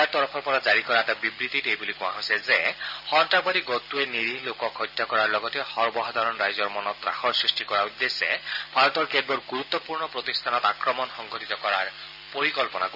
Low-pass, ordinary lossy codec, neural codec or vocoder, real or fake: 5.4 kHz; none; none; real